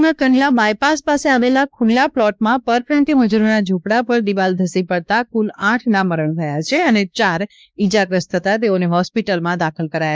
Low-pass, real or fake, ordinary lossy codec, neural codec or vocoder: none; fake; none; codec, 16 kHz, 2 kbps, X-Codec, WavLM features, trained on Multilingual LibriSpeech